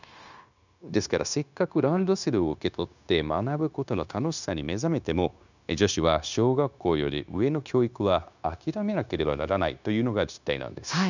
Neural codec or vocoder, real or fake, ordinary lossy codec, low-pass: codec, 16 kHz, 0.9 kbps, LongCat-Audio-Codec; fake; none; 7.2 kHz